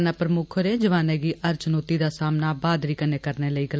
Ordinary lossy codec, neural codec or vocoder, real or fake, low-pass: none; none; real; none